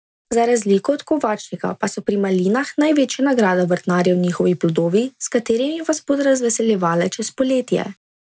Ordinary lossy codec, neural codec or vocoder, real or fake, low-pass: none; none; real; none